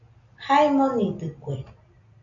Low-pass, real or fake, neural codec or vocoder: 7.2 kHz; real; none